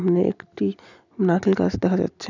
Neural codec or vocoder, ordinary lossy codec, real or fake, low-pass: none; none; real; 7.2 kHz